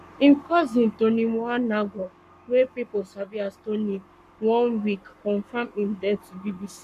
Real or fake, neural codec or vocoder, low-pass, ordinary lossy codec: fake; codec, 44.1 kHz, 7.8 kbps, Pupu-Codec; 14.4 kHz; none